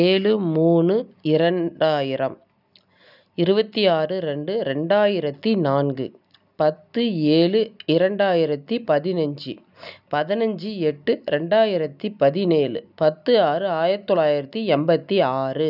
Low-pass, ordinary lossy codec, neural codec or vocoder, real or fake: 5.4 kHz; none; none; real